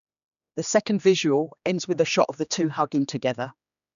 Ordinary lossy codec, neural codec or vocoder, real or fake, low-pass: none; codec, 16 kHz, 2 kbps, X-Codec, HuBERT features, trained on general audio; fake; 7.2 kHz